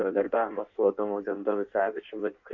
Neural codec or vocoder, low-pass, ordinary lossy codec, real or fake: codec, 16 kHz in and 24 kHz out, 1.1 kbps, FireRedTTS-2 codec; 7.2 kHz; MP3, 48 kbps; fake